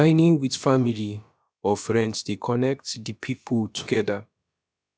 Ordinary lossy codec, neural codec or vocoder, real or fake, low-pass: none; codec, 16 kHz, about 1 kbps, DyCAST, with the encoder's durations; fake; none